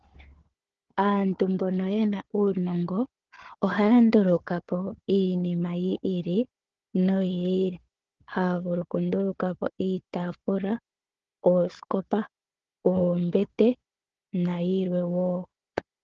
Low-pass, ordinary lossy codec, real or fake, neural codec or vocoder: 7.2 kHz; Opus, 16 kbps; fake; codec, 16 kHz, 4 kbps, FunCodec, trained on Chinese and English, 50 frames a second